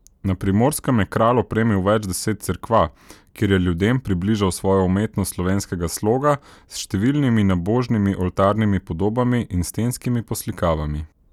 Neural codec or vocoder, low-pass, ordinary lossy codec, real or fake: none; 19.8 kHz; none; real